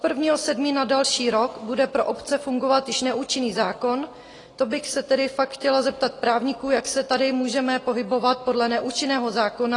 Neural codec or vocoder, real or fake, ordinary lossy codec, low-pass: none; real; AAC, 32 kbps; 10.8 kHz